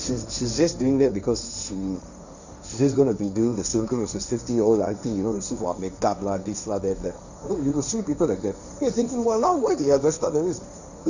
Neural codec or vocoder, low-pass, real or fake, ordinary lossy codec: codec, 16 kHz, 1.1 kbps, Voila-Tokenizer; 7.2 kHz; fake; none